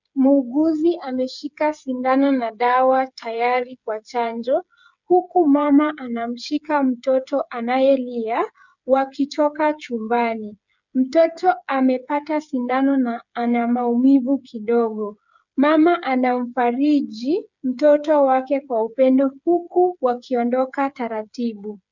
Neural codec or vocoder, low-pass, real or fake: codec, 16 kHz, 8 kbps, FreqCodec, smaller model; 7.2 kHz; fake